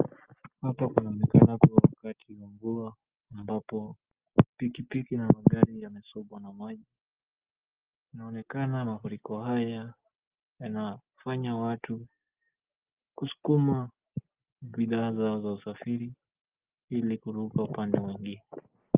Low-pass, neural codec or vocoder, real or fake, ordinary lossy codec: 3.6 kHz; none; real; Opus, 24 kbps